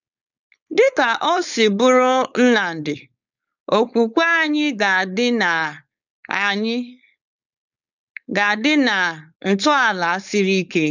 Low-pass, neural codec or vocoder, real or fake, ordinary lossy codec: 7.2 kHz; codec, 16 kHz, 4.8 kbps, FACodec; fake; none